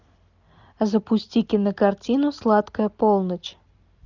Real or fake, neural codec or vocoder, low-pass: real; none; 7.2 kHz